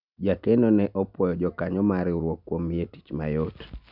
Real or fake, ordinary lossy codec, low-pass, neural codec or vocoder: real; none; 5.4 kHz; none